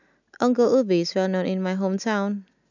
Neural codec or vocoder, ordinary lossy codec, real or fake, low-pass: none; none; real; 7.2 kHz